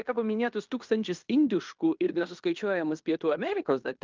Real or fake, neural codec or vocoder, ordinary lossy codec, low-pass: fake; codec, 16 kHz in and 24 kHz out, 0.9 kbps, LongCat-Audio-Codec, fine tuned four codebook decoder; Opus, 24 kbps; 7.2 kHz